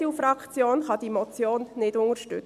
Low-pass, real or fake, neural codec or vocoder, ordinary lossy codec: 14.4 kHz; real; none; none